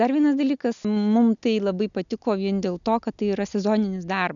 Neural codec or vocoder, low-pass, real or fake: none; 7.2 kHz; real